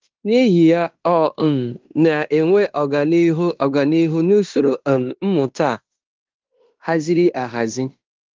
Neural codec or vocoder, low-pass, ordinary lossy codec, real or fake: codec, 16 kHz in and 24 kHz out, 0.9 kbps, LongCat-Audio-Codec, fine tuned four codebook decoder; 7.2 kHz; Opus, 24 kbps; fake